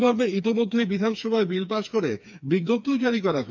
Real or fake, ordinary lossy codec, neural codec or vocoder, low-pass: fake; none; codec, 16 kHz, 4 kbps, FreqCodec, smaller model; 7.2 kHz